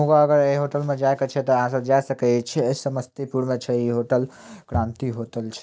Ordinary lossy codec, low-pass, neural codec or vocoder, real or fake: none; none; none; real